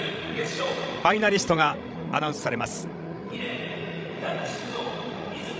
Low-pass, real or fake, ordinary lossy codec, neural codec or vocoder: none; fake; none; codec, 16 kHz, 16 kbps, FreqCodec, larger model